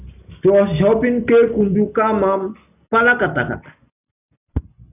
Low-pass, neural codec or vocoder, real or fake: 3.6 kHz; none; real